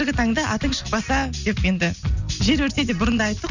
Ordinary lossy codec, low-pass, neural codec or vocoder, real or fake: none; 7.2 kHz; none; real